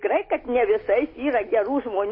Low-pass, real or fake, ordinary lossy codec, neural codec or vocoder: 5.4 kHz; real; MP3, 24 kbps; none